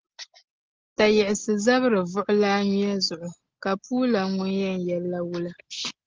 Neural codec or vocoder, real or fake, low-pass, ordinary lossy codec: none; real; 7.2 kHz; Opus, 16 kbps